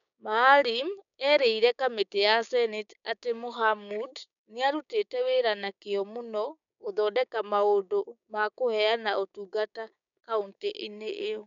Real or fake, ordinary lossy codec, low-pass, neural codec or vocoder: fake; none; 7.2 kHz; codec, 16 kHz, 6 kbps, DAC